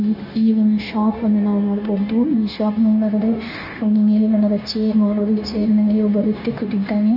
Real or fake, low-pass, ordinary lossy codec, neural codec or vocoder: fake; 5.4 kHz; none; codec, 16 kHz, 0.9 kbps, LongCat-Audio-Codec